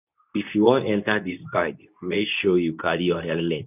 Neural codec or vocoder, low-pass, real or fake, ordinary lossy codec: codec, 24 kHz, 0.9 kbps, WavTokenizer, medium speech release version 2; 3.6 kHz; fake; none